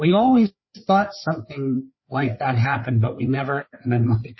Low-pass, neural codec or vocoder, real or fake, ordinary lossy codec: 7.2 kHz; codec, 16 kHz, 4 kbps, FreqCodec, larger model; fake; MP3, 24 kbps